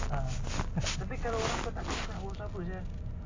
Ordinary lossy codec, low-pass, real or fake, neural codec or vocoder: AAC, 32 kbps; 7.2 kHz; real; none